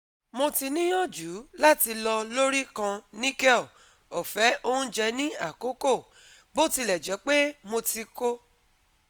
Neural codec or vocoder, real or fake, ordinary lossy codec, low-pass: none; real; none; none